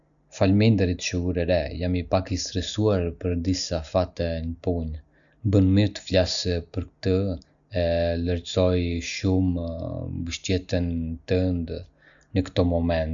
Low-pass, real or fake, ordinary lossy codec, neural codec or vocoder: 7.2 kHz; real; none; none